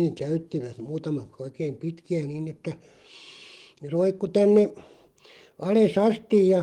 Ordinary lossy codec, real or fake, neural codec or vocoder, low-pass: Opus, 24 kbps; fake; codec, 44.1 kHz, 7.8 kbps, DAC; 19.8 kHz